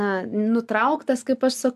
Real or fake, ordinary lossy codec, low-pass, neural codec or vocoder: real; MP3, 96 kbps; 14.4 kHz; none